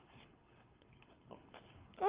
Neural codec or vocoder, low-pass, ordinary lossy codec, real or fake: codec, 24 kHz, 6 kbps, HILCodec; 3.6 kHz; Opus, 24 kbps; fake